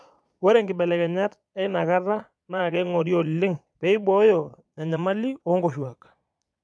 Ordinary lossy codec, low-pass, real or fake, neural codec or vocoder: none; none; fake; vocoder, 22.05 kHz, 80 mel bands, Vocos